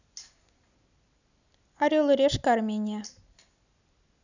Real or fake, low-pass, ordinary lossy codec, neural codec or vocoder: real; 7.2 kHz; none; none